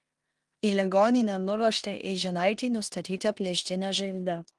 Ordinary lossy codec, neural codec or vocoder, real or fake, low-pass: Opus, 24 kbps; codec, 16 kHz in and 24 kHz out, 0.9 kbps, LongCat-Audio-Codec, four codebook decoder; fake; 10.8 kHz